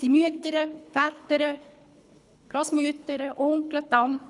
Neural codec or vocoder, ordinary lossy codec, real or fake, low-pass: codec, 24 kHz, 3 kbps, HILCodec; none; fake; 10.8 kHz